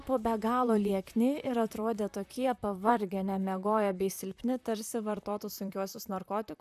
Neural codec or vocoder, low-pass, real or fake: vocoder, 44.1 kHz, 128 mel bands, Pupu-Vocoder; 14.4 kHz; fake